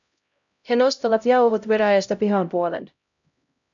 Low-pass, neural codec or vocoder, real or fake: 7.2 kHz; codec, 16 kHz, 0.5 kbps, X-Codec, HuBERT features, trained on LibriSpeech; fake